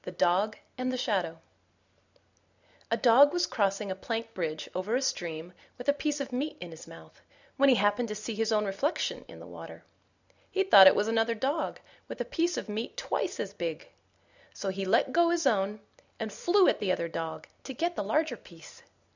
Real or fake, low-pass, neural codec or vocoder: real; 7.2 kHz; none